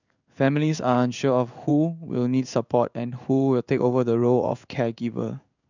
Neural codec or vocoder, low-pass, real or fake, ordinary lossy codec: codec, 16 kHz in and 24 kHz out, 1 kbps, XY-Tokenizer; 7.2 kHz; fake; none